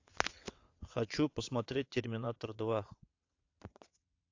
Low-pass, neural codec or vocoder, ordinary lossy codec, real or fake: 7.2 kHz; vocoder, 22.05 kHz, 80 mel bands, WaveNeXt; AAC, 48 kbps; fake